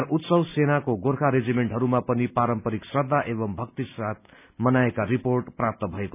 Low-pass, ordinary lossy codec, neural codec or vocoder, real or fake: 3.6 kHz; none; none; real